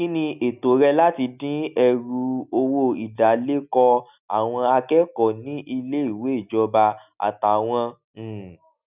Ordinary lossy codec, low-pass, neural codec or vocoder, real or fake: none; 3.6 kHz; none; real